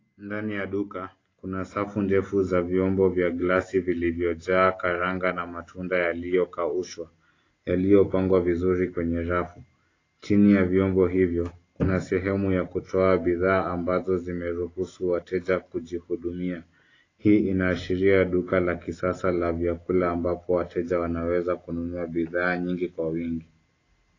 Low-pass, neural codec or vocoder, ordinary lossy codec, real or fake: 7.2 kHz; none; AAC, 32 kbps; real